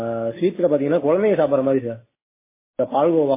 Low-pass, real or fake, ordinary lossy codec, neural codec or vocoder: 3.6 kHz; real; MP3, 16 kbps; none